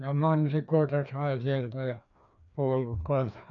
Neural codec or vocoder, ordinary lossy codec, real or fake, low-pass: codec, 16 kHz, 2 kbps, FreqCodec, larger model; none; fake; 7.2 kHz